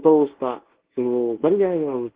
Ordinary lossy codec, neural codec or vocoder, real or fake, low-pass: Opus, 16 kbps; codec, 24 kHz, 0.9 kbps, WavTokenizer, medium speech release version 1; fake; 3.6 kHz